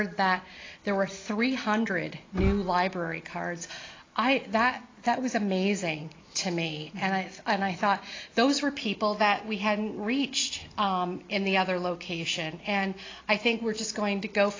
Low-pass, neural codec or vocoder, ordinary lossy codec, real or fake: 7.2 kHz; none; AAC, 32 kbps; real